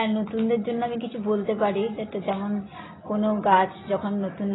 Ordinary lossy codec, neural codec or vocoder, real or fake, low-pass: AAC, 16 kbps; none; real; 7.2 kHz